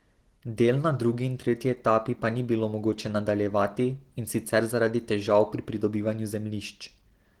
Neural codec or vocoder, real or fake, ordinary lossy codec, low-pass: none; real; Opus, 16 kbps; 19.8 kHz